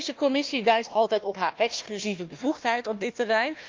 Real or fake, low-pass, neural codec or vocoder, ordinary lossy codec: fake; 7.2 kHz; codec, 16 kHz, 1 kbps, FunCodec, trained on Chinese and English, 50 frames a second; Opus, 24 kbps